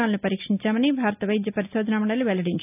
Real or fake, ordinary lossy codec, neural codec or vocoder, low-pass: real; none; none; 3.6 kHz